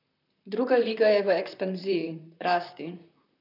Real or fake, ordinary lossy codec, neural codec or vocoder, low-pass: fake; none; vocoder, 44.1 kHz, 128 mel bands, Pupu-Vocoder; 5.4 kHz